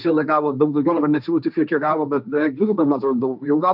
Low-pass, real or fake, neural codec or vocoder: 5.4 kHz; fake; codec, 16 kHz, 1.1 kbps, Voila-Tokenizer